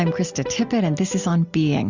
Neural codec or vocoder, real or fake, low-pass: none; real; 7.2 kHz